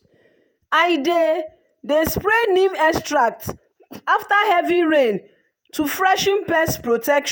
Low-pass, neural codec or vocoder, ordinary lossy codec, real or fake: none; vocoder, 48 kHz, 128 mel bands, Vocos; none; fake